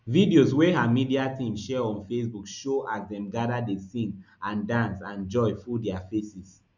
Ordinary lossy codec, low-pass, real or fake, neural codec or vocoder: none; 7.2 kHz; real; none